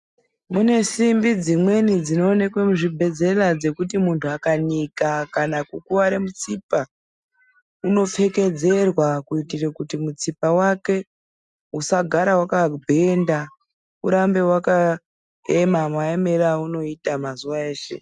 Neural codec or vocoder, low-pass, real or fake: none; 10.8 kHz; real